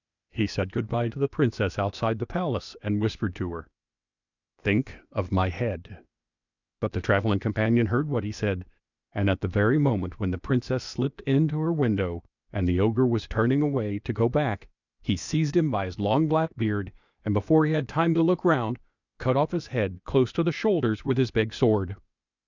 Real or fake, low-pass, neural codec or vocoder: fake; 7.2 kHz; codec, 16 kHz, 0.8 kbps, ZipCodec